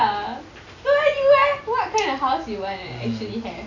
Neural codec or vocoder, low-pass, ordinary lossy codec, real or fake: none; 7.2 kHz; none; real